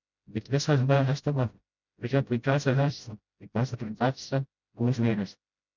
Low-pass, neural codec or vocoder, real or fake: 7.2 kHz; codec, 16 kHz, 0.5 kbps, FreqCodec, smaller model; fake